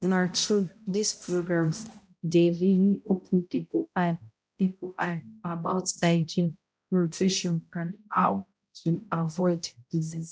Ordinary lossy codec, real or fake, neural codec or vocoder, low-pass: none; fake; codec, 16 kHz, 0.5 kbps, X-Codec, HuBERT features, trained on balanced general audio; none